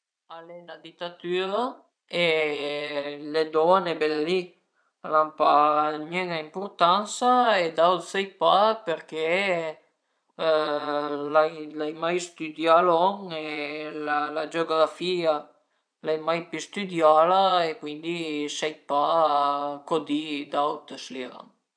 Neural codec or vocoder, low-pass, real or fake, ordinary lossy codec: vocoder, 22.05 kHz, 80 mel bands, Vocos; 9.9 kHz; fake; none